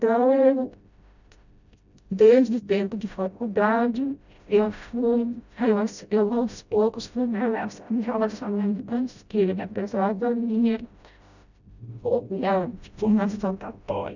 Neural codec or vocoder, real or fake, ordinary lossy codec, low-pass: codec, 16 kHz, 0.5 kbps, FreqCodec, smaller model; fake; none; 7.2 kHz